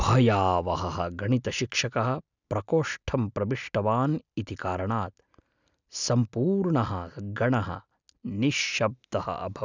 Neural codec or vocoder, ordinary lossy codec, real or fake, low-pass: none; none; real; 7.2 kHz